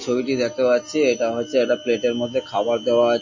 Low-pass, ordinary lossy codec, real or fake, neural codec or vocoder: 7.2 kHz; MP3, 32 kbps; real; none